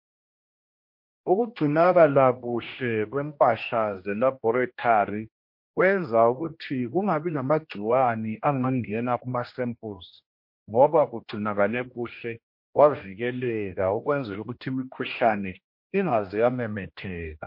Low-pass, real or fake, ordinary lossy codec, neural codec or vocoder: 5.4 kHz; fake; MP3, 32 kbps; codec, 16 kHz, 1 kbps, X-Codec, HuBERT features, trained on general audio